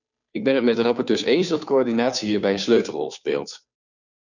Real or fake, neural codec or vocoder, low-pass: fake; codec, 16 kHz, 2 kbps, FunCodec, trained on Chinese and English, 25 frames a second; 7.2 kHz